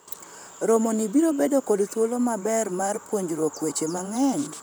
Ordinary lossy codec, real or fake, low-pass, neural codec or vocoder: none; fake; none; vocoder, 44.1 kHz, 128 mel bands, Pupu-Vocoder